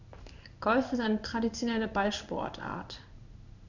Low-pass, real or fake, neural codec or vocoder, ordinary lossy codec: 7.2 kHz; fake; codec, 16 kHz in and 24 kHz out, 1 kbps, XY-Tokenizer; none